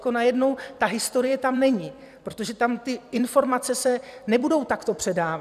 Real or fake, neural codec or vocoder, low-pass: fake; vocoder, 44.1 kHz, 128 mel bands every 256 samples, BigVGAN v2; 14.4 kHz